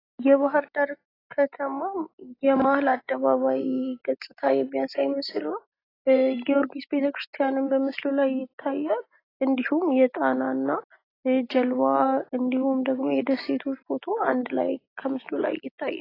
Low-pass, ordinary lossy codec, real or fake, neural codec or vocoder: 5.4 kHz; AAC, 24 kbps; real; none